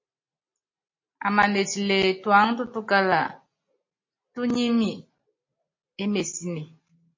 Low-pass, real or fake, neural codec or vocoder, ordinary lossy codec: 7.2 kHz; real; none; MP3, 32 kbps